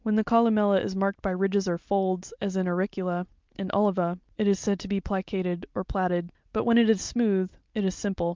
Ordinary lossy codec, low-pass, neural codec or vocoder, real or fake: Opus, 24 kbps; 7.2 kHz; none; real